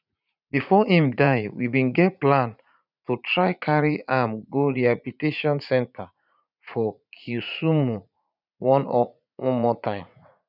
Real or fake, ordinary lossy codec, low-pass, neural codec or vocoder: fake; none; 5.4 kHz; vocoder, 44.1 kHz, 80 mel bands, Vocos